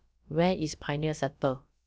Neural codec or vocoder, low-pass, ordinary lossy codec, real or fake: codec, 16 kHz, about 1 kbps, DyCAST, with the encoder's durations; none; none; fake